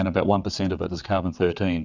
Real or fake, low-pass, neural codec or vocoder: fake; 7.2 kHz; vocoder, 22.05 kHz, 80 mel bands, WaveNeXt